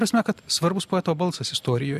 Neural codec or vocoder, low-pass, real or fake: none; 14.4 kHz; real